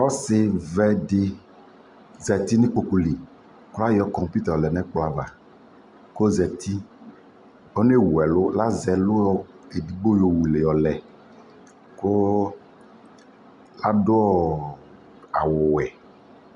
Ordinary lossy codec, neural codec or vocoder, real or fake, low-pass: MP3, 96 kbps; none; real; 10.8 kHz